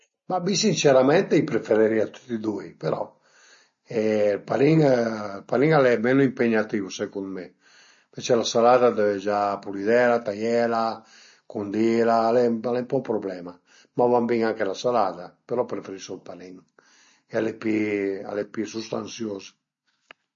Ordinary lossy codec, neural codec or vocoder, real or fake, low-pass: MP3, 32 kbps; none; real; 7.2 kHz